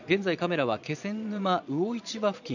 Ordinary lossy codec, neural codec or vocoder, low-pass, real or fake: none; vocoder, 22.05 kHz, 80 mel bands, Vocos; 7.2 kHz; fake